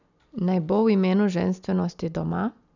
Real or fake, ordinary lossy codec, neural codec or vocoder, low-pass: real; none; none; 7.2 kHz